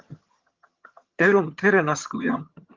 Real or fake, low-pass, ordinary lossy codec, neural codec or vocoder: fake; 7.2 kHz; Opus, 32 kbps; vocoder, 22.05 kHz, 80 mel bands, HiFi-GAN